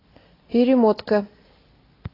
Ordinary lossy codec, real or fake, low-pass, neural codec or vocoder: AAC, 24 kbps; real; 5.4 kHz; none